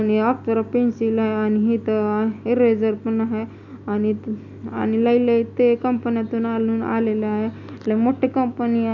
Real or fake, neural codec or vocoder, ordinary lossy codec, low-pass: real; none; none; 7.2 kHz